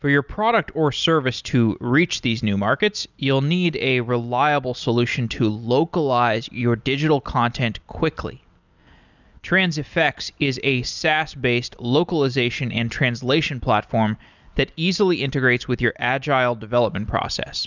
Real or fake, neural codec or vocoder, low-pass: real; none; 7.2 kHz